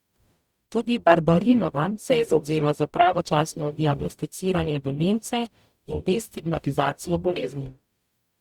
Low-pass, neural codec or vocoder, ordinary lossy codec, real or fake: 19.8 kHz; codec, 44.1 kHz, 0.9 kbps, DAC; Opus, 64 kbps; fake